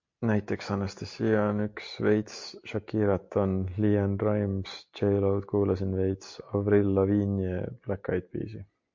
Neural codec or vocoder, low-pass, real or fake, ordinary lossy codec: none; 7.2 kHz; real; MP3, 48 kbps